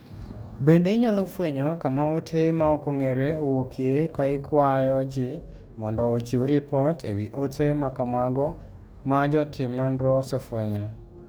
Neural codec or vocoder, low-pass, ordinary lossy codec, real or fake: codec, 44.1 kHz, 2.6 kbps, DAC; none; none; fake